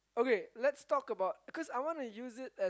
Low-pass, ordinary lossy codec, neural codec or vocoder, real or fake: none; none; none; real